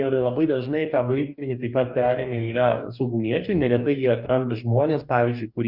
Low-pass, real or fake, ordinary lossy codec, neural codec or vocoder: 5.4 kHz; fake; Opus, 64 kbps; codec, 44.1 kHz, 2.6 kbps, DAC